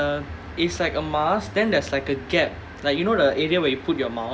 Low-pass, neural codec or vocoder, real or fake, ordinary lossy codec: none; none; real; none